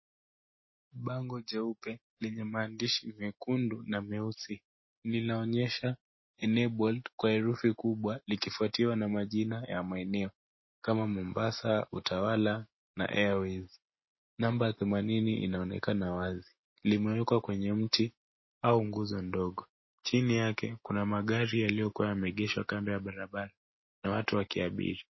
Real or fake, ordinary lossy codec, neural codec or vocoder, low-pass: real; MP3, 24 kbps; none; 7.2 kHz